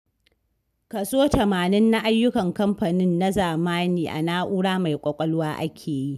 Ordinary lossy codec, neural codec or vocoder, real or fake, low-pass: none; none; real; 14.4 kHz